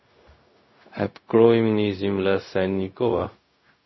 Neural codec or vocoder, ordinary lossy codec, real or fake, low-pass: codec, 16 kHz, 0.4 kbps, LongCat-Audio-Codec; MP3, 24 kbps; fake; 7.2 kHz